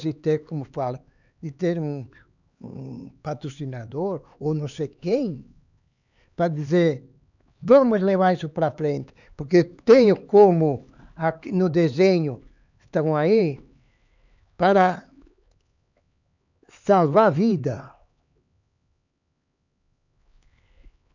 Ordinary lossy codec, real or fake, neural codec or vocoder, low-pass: none; fake; codec, 16 kHz, 4 kbps, X-Codec, HuBERT features, trained on LibriSpeech; 7.2 kHz